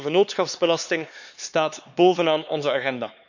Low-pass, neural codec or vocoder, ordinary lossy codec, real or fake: 7.2 kHz; codec, 16 kHz, 4 kbps, X-Codec, HuBERT features, trained on LibriSpeech; none; fake